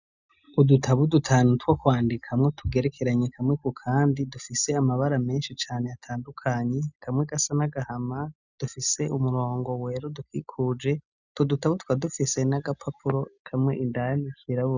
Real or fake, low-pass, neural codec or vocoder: real; 7.2 kHz; none